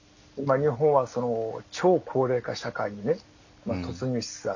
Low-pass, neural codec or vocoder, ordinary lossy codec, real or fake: 7.2 kHz; none; none; real